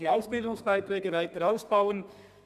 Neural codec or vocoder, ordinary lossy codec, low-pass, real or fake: codec, 32 kHz, 1.9 kbps, SNAC; none; 14.4 kHz; fake